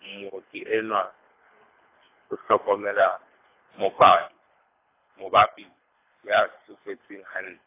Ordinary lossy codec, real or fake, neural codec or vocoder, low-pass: AAC, 24 kbps; fake; codec, 24 kHz, 3 kbps, HILCodec; 3.6 kHz